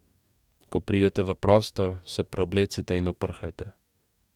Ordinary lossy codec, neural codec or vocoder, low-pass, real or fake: none; codec, 44.1 kHz, 2.6 kbps, DAC; 19.8 kHz; fake